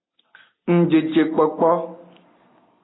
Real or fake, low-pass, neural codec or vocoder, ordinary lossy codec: real; 7.2 kHz; none; AAC, 16 kbps